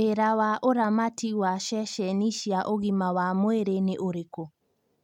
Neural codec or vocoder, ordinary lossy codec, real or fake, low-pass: none; MP3, 96 kbps; real; 19.8 kHz